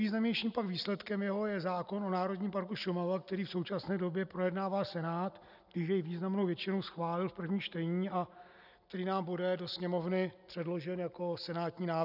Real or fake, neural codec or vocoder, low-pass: real; none; 5.4 kHz